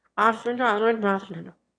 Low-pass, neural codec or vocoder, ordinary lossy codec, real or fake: 9.9 kHz; autoencoder, 22.05 kHz, a latent of 192 numbers a frame, VITS, trained on one speaker; AAC, 48 kbps; fake